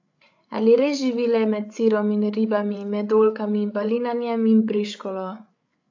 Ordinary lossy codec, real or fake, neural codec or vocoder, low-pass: AAC, 48 kbps; fake; codec, 16 kHz, 8 kbps, FreqCodec, larger model; 7.2 kHz